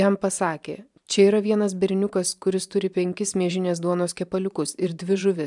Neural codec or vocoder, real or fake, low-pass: vocoder, 44.1 kHz, 128 mel bands every 512 samples, BigVGAN v2; fake; 10.8 kHz